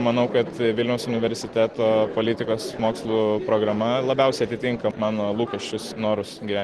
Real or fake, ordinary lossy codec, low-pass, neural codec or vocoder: real; Opus, 16 kbps; 10.8 kHz; none